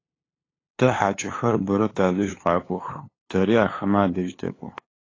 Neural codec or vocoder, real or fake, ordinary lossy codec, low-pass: codec, 16 kHz, 2 kbps, FunCodec, trained on LibriTTS, 25 frames a second; fake; AAC, 32 kbps; 7.2 kHz